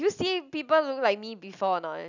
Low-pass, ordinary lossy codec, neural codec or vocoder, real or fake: 7.2 kHz; none; none; real